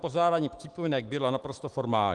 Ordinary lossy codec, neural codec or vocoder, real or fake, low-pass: Opus, 24 kbps; autoencoder, 48 kHz, 128 numbers a frame, DAC-VAE, trained on Japanese speech; fake; 10.8 kHz